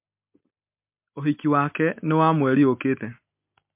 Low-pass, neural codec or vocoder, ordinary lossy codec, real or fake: 3.6 kHz; none; MP3, 32 kbps; real